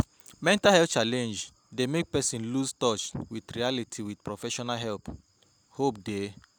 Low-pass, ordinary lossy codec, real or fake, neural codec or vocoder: none; none; real; none